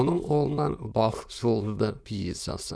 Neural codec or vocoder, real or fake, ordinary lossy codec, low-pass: autoencoder, 22.05 kHz, a latent of 192 numbers a frame, VITS, trained on many speakers; fake; none; none